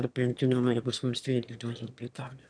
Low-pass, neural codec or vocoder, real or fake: 9.9 kHz; autoencoder, 22.05 kHz, a latent of 192 numbers a frame, VITS, trained on one speaker; fake